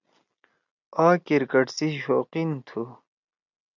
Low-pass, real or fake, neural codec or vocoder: 7.2 kHz; real; none